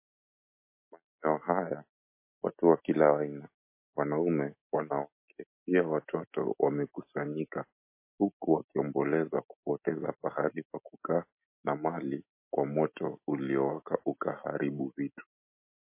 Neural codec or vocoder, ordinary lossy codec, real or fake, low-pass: none; MP3, 24 kbps; real; 3.6 kHz